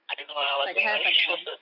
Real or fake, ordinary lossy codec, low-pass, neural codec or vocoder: fake; none; 5.4 kHz; vocoder, 24 kHz, 100 mel bands, Vocos